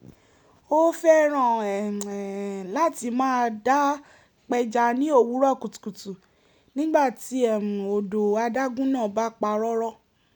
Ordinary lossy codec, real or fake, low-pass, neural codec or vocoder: none; real; none; none